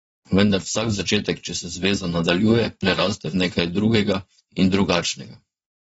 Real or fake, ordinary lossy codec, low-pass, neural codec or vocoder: fake; AAC, 24 kbps; 9.9 kHz; vocoder, 22.05 kHz, 80 mel bands, Vocos